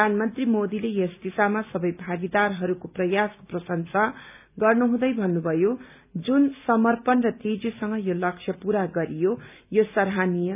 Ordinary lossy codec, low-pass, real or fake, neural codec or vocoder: none; 3.6 kHz; real; none